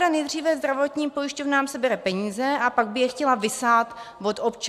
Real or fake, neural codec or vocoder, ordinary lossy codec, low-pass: real; none; AAC, 96 kbps; 14.4 kHz